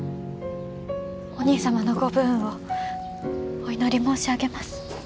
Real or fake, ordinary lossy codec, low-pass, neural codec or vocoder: real; none; none; none